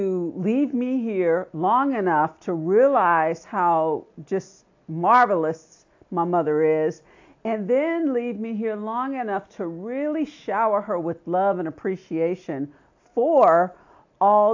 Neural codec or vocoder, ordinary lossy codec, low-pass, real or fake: none; AAC, 48 kbps; 7.2 kHz; real